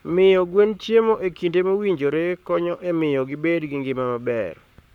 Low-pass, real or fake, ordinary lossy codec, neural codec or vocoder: 19.8 kHz; fake; none; codec, 44.1 kHz, 7.8 kbps, Pupu-Codec